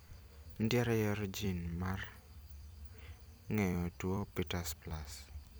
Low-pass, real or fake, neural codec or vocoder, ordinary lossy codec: none; fake; vocoder, 44.1 kHz, 128 mel bands every 256 samples, BigVGAN v2; none